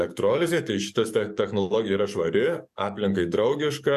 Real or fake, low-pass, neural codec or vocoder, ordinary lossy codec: fake; 14.4 kHz; codec, 44.1 kHz, 7.8 kbps, DAC; AAC, 96 kbps